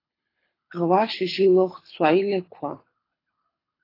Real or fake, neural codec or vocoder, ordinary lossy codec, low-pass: fake; codec, 24 kHz, 6 kbps, HILCodec; AAC, 24 kbps; 5.4 kHz